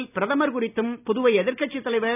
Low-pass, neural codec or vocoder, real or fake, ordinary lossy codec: 3.6 kHz; none; real; none